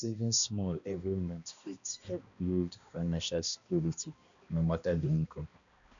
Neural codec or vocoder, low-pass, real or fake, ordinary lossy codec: codec, 16 kHz, 1 kbps, X-Codec, HuBERT features, trained on balanced general audio; 7.2 kHz; fake; MP3, 96 kbps